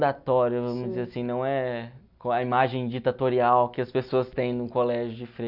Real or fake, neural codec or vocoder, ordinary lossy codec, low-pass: real; none; none; 5.4 kHz